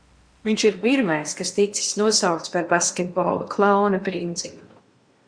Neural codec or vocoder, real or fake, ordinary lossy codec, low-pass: codec, 16 kHz in and 24 kHz out, 0.8 kbps, FocalCodec, streaming, 65536 codes; fake; Opus, 64 kbps; 9.9 kHz